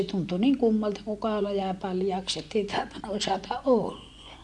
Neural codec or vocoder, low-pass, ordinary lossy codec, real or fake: vocoder, 24 kHz, 100 mel bands, Vocos; none; none; fake